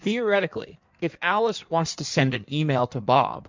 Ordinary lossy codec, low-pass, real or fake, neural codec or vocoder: MP3, 64 kbps; 7.2 kHz; fake; codec, 16 kHz in and 24 kHz out, 1.1 kbps, FireRedTTS-2 codec